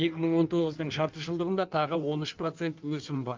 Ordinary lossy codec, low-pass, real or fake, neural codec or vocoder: Opus, 24 kbps; 7.2 kHz; fake; codec, 16 kHz in and 24 kHz out, 1.1 kbps, FireRedTTS-2 codec